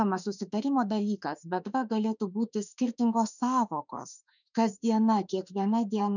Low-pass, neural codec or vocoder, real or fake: 7.2 kHz; autoencoder, 48 kHz, 32 numbers a frame, DAC-VAE, trained on Japanese speech; fake